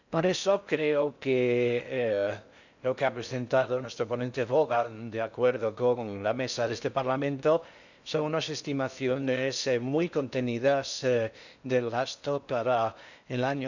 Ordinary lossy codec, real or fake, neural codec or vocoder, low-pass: none; fake; codec, 16 kHz in and 24 kHz out, 0.6 kbps, FocalCodec, streaming, 4096 codes; 7.2 kHz